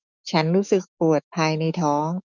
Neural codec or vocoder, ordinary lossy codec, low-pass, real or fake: autoencoder, 48 kHz, 128 numbers a frame, DAC-VAE, trained on Japanese speech; none; 7.2 kHz; fake